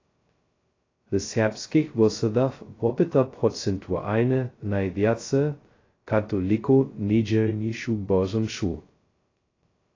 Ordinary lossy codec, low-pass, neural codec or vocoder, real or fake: AAC, 32 kbps; 7.2 kHz; codec, 16 kHz, 0.2 kbps, FocalCodec; fake